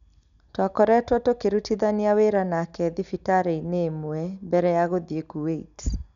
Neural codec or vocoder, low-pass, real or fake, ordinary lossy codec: none; 7.2 kHz; real; none